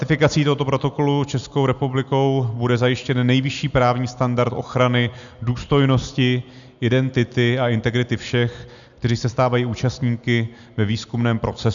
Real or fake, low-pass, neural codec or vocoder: real; 7.2 kHz; none